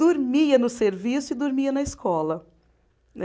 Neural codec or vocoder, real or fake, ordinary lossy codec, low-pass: none; real; none; none